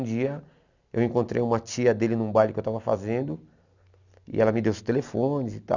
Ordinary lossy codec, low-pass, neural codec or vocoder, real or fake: none; 7.2 kHz; none; real